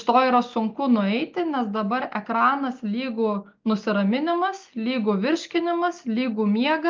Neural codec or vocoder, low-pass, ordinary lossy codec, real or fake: none; 7.2 kHz; Opus, 32 kbps; real